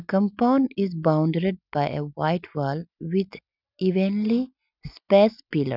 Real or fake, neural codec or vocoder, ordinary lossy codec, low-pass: real; none; none; 5.4 kHz